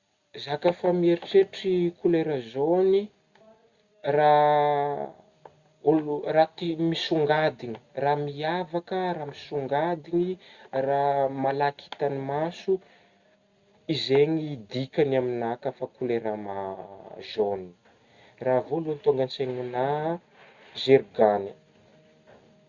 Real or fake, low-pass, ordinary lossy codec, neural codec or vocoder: real; 7.2 kHz; Opus, 64 kbps; none